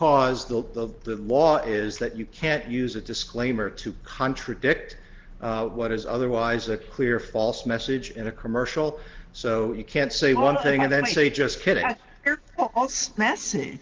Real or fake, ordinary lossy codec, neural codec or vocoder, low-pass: real; Opus, 16 kbps; none; 7.2 kHz